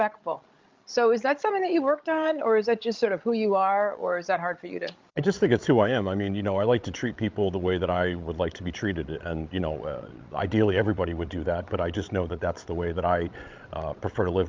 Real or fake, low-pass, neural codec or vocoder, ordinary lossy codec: fake; 7.2 kHz; codec, 16 kHz, 16 kbps, FreqCodec, larger model; Opus, 24 kbps